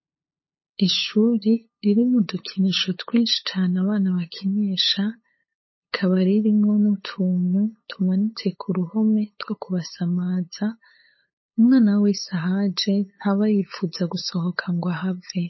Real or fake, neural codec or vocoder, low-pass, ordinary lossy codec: fake; codec, 16 kHz, 8 kbps, FunCodec, trained on LibriTTS, 25 frames a second; 7.2 kHz; MP3, 24 kbps